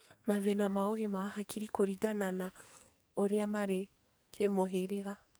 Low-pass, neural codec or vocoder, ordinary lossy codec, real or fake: none; codec, 44.1 kHz, 2.6 kbps, SNAC; none; fake